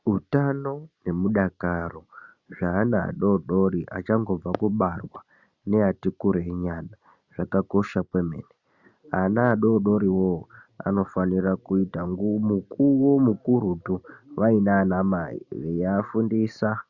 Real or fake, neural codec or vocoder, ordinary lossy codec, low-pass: real; none; Opus, 64 kbps; 7.2 kHz